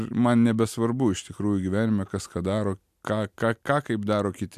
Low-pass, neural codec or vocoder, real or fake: 14.4 kHz; none; real